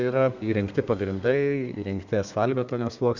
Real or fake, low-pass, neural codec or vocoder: fake; 7.2 kHz; codec, 32 kHz, 1.9 kbps, SNAC